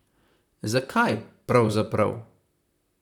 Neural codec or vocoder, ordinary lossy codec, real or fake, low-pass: vocoder, 44.1 kHz, 128 mel bands, Pupu-Vocoder; none; fake; 19.8 kHz